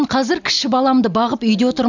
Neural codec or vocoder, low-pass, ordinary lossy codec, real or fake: none; 7.2 kHz; none; real